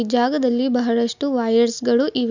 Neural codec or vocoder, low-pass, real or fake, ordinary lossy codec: none; 7.2 kHz; real; none